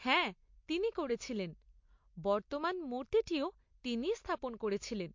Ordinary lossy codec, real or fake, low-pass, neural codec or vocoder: MP3, 48 kbps; real; 7.2 kHz; none